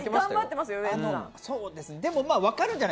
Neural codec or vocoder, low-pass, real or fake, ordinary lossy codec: none; none; real; none